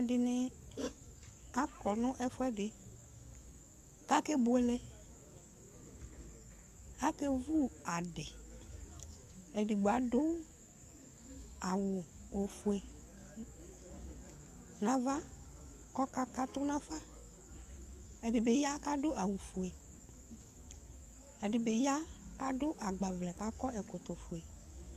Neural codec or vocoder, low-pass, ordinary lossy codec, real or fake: codec, 44.1 kHz, 7.8 kbps, DAC; 14.4 kHz; Opus, 64 kbps; fake